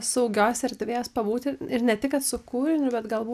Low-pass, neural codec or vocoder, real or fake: 14.4 kHz; none; real